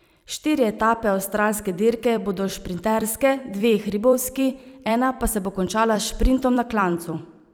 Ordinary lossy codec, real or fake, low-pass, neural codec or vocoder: none; fake; none; vocoder, 44.1 kHz, 128 mel bands every 256 samples, BigVGAN v2